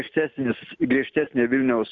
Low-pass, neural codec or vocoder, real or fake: 7.2 kHz; none; real